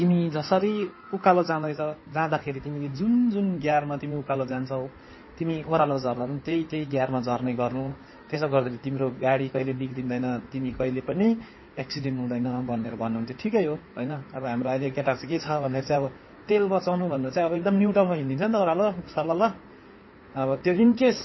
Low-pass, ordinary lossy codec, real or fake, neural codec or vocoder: 7.2 kHz; MP3, 24 kbps; fake; codec, 16 kHz in and 24 kHz out, 2.2 kbps, FireRedTTS-2 codec